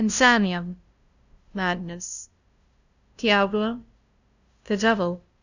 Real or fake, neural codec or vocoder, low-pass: fake; codec, 16 kHz, 0.5 kbps, FunCodec, trained on LibriTTS, 25 frames a second; 7.2 kHz